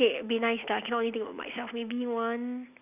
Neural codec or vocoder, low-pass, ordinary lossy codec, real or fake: none; 3.6 kHz; none; real